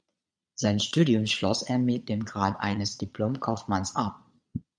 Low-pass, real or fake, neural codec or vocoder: 9.9 kHz; fake; vocoder, 22.05 kHz, 80 mel bands, Vocos